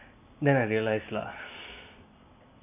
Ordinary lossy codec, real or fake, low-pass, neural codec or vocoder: MP3, 24 kbps; real; 3.6 kHz; none